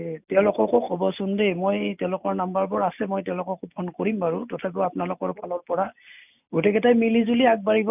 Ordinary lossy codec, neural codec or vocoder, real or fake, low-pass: none; none; real; 3.6 kHz